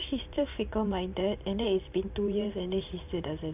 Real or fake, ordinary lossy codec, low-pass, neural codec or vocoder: fake; AAC, 32 kbps; 3.6 kHz; vocoder, 44.1 kHz, 128 mel bands every 512 samples, BigVGAN v2